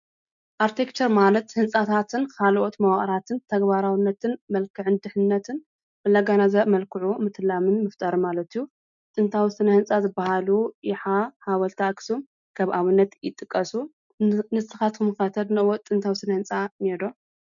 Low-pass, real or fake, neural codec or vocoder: 7.2 kHz; real; none